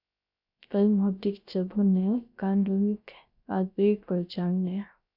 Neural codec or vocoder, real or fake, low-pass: codec, 16 kHz, 0.3 kbps, FocalCodec; fake; 5.4 kHz